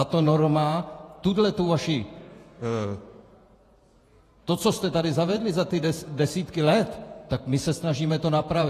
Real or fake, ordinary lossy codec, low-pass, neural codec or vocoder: fake; AAC, 48 kbps; 14.4 kHz; vocoder, 48 kHz, 128 mel bands, Vocos